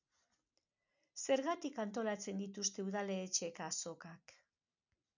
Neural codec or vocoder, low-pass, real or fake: none; 7.2 kHz; real